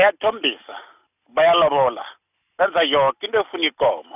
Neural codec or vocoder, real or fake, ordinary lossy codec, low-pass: none; real; none; 3.6 kHz